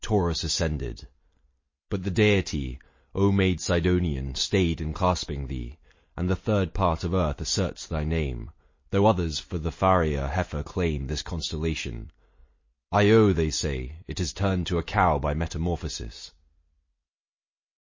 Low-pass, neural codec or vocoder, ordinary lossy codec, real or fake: 7.2 kHz; none; MP3, 32 kbps; real